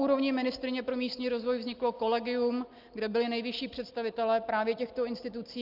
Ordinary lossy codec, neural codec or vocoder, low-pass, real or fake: Opus, 24 kbps; none; 5.4 kHz; real